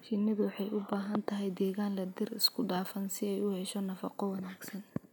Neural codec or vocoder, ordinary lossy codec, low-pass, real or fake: none; none; none; real